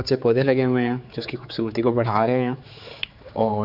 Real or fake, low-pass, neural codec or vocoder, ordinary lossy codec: fake; 5.4 kHz; codec, 16 kHz, 4 kbps, X-Codec, HuBERT features, trained on general audio; none